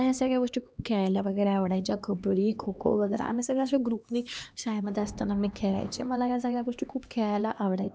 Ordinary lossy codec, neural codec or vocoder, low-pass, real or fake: none; codec, 16 kHz, 2 kbps, X-Codec, HuBERT features, trained on LibriSpeech; none; fake